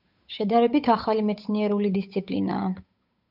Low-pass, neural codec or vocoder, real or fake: 5.4 kHz; codec, 16 kHz, 8 kbps, FunCodec, trained on Chinese and English, 25 frames a second; fake